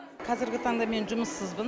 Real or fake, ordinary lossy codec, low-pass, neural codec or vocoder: real; none; none; none